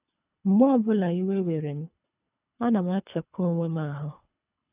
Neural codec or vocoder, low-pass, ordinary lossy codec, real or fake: codec, 24 kHz, 3 kbps, HILCodec; 3.6 kHz; none; fake